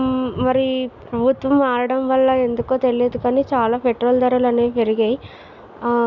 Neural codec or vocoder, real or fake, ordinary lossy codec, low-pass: none; real; none; 7.2 kHz